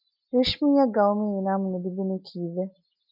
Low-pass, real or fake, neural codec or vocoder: 5.4 kHz; real; none